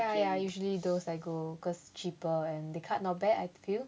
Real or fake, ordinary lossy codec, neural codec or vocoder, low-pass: real; none; none; none